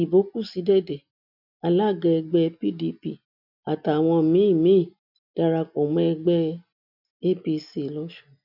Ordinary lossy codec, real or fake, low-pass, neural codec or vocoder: none; real; 5.4 kHz; none